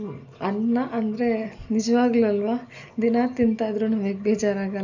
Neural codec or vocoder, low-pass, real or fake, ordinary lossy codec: none; 7.2 kHz; real; none